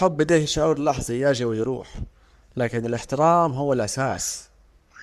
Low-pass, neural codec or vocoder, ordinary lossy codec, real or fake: 14.4 kHz; codec, 44.1 kHz, 3.4 kbps, Pupu-Codec; Opus, 64 kbps; fake